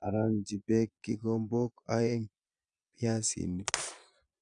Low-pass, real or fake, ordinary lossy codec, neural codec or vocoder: 9.9 kHz; fake; none; vocoder, 22.05 kHz, 80 mel bands, Vocos